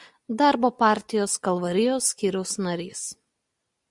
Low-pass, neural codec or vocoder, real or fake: 10.8 kHz; none; real